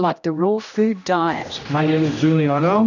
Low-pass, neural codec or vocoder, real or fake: 7.2 kHz; codec, 16 kHz, 1 kbps, X-Codec, HuBERT features, trained on general audio; fake